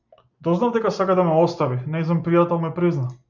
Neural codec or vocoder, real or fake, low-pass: none; real; 7.2 kHz